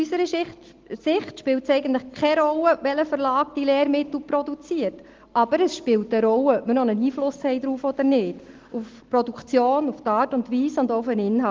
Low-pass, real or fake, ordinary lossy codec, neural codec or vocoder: 7.2 kHz; real; Opus, 16 kbps; none